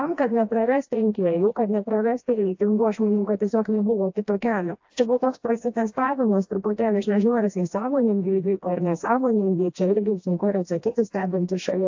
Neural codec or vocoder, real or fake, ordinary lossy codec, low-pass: codec, 16 kHz, 1 kbps, FreqCodec, smaller model; fake; AAC, 48 kbps; 7.2 kHz